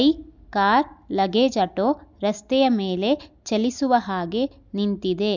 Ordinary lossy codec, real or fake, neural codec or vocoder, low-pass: none; real; none; 7.2 kHz